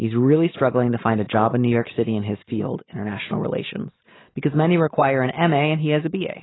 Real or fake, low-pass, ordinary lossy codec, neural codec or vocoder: real; 7.2 kHz; AAC, 16 kbps; none